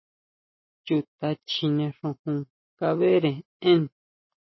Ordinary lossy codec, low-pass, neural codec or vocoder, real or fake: MP3, 24 kbps; 7.2 kHz; none; real